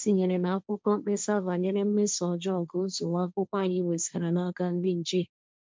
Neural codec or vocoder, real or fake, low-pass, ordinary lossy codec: codec, 16 kHz, 1.1 kbps, Voila-Tokenizer; fake; none; none